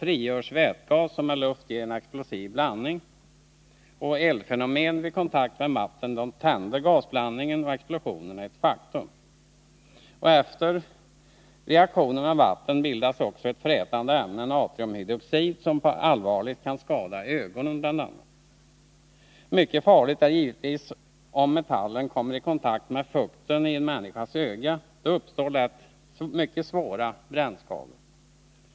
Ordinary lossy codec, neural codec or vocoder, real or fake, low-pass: none; none; real; none